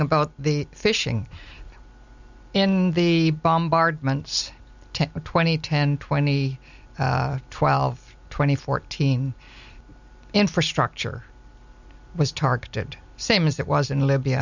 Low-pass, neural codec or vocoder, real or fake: 7.2 kHz; none; real